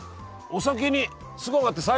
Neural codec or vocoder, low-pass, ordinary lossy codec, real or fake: none; none; none; real